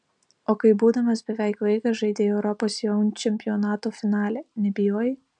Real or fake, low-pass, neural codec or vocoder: real; 9.9 kHz; none